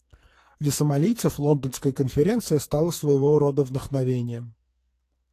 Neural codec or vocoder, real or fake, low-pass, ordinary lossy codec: codec, 44.1 kHz, 2.6 kbps, SNAC; fake; 14.4 kHz; AAC, 64 kbps